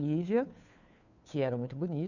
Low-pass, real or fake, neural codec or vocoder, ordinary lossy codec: 7.2 kHz; fake; codec, 16 kHz, 2 kbps, FunCodec, trained on Chinese and English, 25 frames a second; none